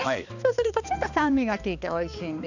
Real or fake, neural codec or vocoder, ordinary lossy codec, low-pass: fake; codec, 16 kHz, 2 kbps, X-Codec, HuBERT features, trained on balanced general audio; none; 7.2 kHz